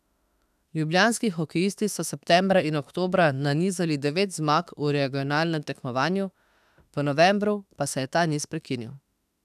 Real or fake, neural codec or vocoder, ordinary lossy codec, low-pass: fake; autoencoder, 48 kHz, 32 numbers a frame, DAC-VAE, trained on Japanese speech; none; 14.4 kHz